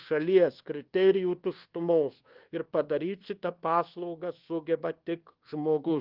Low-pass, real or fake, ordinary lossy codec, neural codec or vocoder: 5.4 kHz; fake; Opus, 16 kbps; codec, 24 kHz, 1.2 kbps, DualCodec